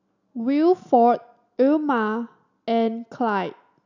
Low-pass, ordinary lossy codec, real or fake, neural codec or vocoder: 7.2 kHz; none; real; none